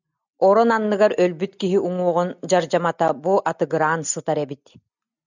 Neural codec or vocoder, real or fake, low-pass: none; real; 7.2 kHz